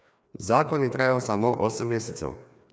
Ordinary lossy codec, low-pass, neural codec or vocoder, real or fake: none; none; codec, 16 kHz, 2 kbps, FreqCodec, larger model; fake